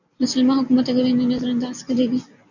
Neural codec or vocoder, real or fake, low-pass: none; real; 7.2 kHz